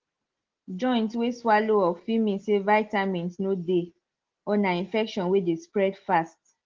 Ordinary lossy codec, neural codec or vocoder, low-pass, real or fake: Opus, 16 kbps; none; 7.2 kHz; real